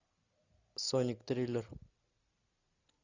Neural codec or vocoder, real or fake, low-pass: none; real; 7.2 kHz